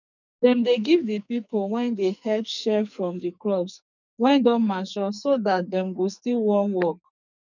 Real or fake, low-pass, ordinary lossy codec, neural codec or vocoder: fake; 7.2 kHz; none; codec, 44.1 kHz, 2.6 kbps, SNAC